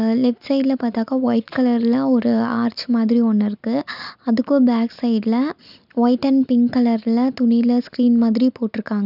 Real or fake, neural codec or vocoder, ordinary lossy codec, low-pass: real; none; none; 5.4 kHz